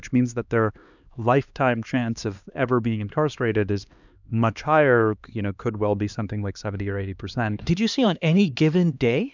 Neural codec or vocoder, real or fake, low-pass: codec, 16 kHz, 2 kbps, X-Codec, HuBERT features, trained on LibriSpeech; fake; 7.2 kHz